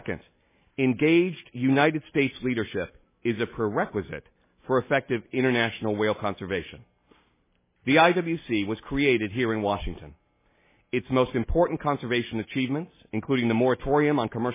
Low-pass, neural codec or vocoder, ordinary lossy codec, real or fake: 3.6 kHz; none; MP3, 16 kbps; real